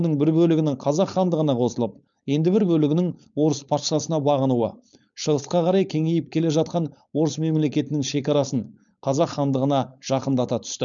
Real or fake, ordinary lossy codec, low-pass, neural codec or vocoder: fake; none; 7.2 kHz; codec, 16 kHz, 4.8 kbps, FACodec